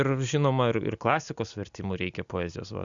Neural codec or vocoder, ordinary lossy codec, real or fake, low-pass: none; Opus, 64 kbps; real; 7.2 kHz